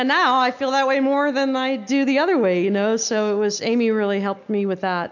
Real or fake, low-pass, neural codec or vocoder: real; 7.2 kHz; none